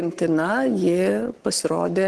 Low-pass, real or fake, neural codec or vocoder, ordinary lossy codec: 10.8 kHz; fake; codec, 44.1 kHz, 7.8 kbps, Pupu-Codec; Opus, 16 kbps